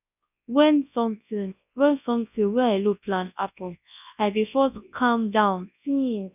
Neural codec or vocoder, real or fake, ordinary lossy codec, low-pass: codec, 24 kHz, 0.9 kbps, WavTokenizer, large speech release; fake; none; 3.6 kHz